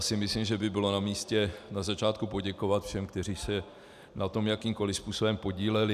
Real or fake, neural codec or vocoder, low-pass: real; none; 14.4 kHz